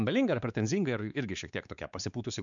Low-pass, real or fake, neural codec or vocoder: 7.2 kHz; fake; codec, 16 kHz, 4 kbps, X-Codec, WavLM features, trained on Multilingual LibriSpeech